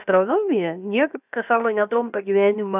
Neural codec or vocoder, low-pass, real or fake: codec, 16 kHz, 0.7 kbps, FocalCodec; 3.6 kHz; fake